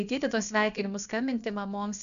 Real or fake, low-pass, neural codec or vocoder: fake; 7.2 kHz; codec, 16 kHz, about 1 kbps, DyCAST, with the encoder's durations